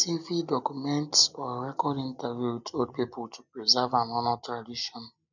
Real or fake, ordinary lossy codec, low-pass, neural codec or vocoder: real; none; 7.2 kHz; none